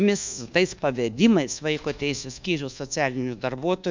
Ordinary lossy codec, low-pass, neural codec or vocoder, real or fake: MP3, 64 kbps; 7.2 kHz; codec, 24 kHz, 1.2 kbps, DualCodec; fake